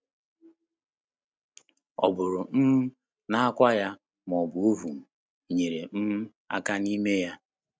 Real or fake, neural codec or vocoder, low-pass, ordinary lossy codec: real; none; none; none